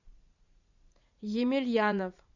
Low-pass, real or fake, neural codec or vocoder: 7.2 kHz; fake; vocoder, 44.1 kHz, 80 mel bands, Vocos